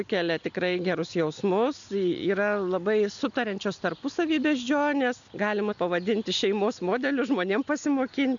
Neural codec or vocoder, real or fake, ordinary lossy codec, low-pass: none; real; MP3, 96 kbps; 7.2 kHz